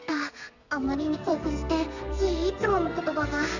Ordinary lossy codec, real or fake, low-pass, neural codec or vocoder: none; fake; 7.2 kHz; codec, 32 kHz, 1.9 kbps, SNAC